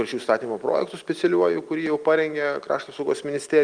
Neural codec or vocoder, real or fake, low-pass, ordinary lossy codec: none; real; 9.9 kHz; Opus, 32 kbps